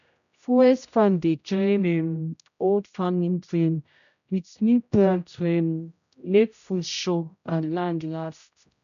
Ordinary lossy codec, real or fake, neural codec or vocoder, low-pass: none; fake; codec, 16 kHz, 0.5 kbps, X-Codec, HuBERT features, trained on general audio; 7.2 kHz